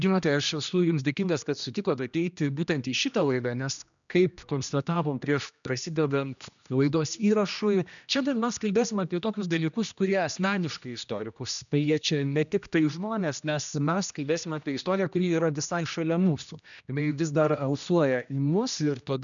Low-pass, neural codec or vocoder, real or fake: 7.2 kHz; codec, 16 kHz, 1 kbps, X-Codec, HuBERT features, trained on general audio; fake